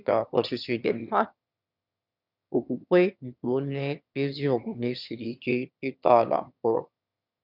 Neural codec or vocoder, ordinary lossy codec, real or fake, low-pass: autoencoder, 22.05 kHz, a latent of 192 numbers a frame, VITS, trained on one speaker; none; fake; 5.4 kHz